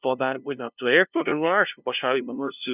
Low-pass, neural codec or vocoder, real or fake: 3.6 kHz; codec, 16 kHz, 0.5 kbps, FunCodec, trained on LibriTTS, 25 frames a second; fake